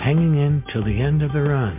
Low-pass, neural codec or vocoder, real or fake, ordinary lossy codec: 3.6 kHz; none; real; MP3, 32 kbps